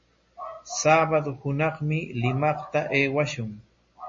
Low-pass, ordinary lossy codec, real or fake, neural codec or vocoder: 7.2 kHz; MP3, 32 kbps; real; none